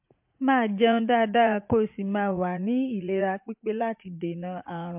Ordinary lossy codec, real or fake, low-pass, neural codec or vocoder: MP3, 32 kbps; fake; 3.6 kHz; vocoder, 44.1 kHz, 80 mel bands, Vocos